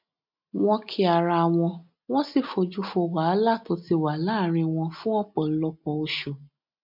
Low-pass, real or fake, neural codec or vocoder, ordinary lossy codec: 5.4 kHz; real; none; MP3, 48 kbps